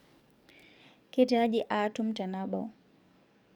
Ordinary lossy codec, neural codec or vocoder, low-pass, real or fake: none; codec, 44.1 kHz, 7.8 kbps, DAC; 19.8 kHz; fake